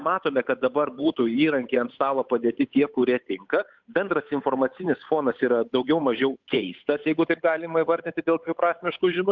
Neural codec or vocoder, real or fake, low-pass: codec, 16 kHz, 8 kbps, FunCodec, trained on Chinese and English, 25 frames a second; fake; 7.2 kHz